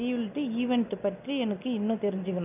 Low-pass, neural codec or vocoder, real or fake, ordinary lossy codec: 3.6 kHz; none; real; none